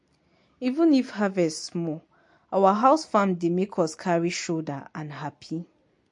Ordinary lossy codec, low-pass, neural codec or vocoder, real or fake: MP3, 48 kbps; 10.8 kHz; none; real